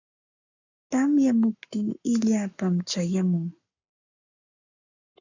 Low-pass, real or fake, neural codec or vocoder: 7.2 kHz; fake; codec, 44.1 kHz, 7.8 kbps, Pupu-Codec